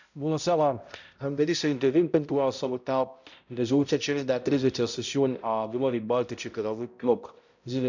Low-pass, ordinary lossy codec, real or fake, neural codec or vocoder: 7.2 kHz; none; fake; codec, 16 kHz, 0.5 kbps, X-Codec, HuBERT features, trained on balanced general audio